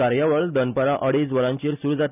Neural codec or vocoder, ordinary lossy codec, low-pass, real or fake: none; none; 3.6 kHz; real